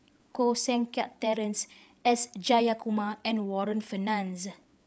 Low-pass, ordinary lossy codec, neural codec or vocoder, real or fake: none; none; codec, 16 kHz, 8 kbps, FreqCodec, larger model; fake